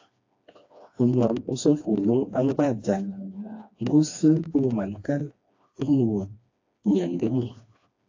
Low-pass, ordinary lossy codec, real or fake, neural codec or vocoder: 7.2 kHz; AAC, 48 kbps; fake; codec, 16 kHz, 2 kbps, FreqCodec, smaller model